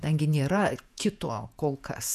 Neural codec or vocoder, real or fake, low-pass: vocoder, 48 kHz, 128 mel bands, Vocos; fake; 14.4 kHz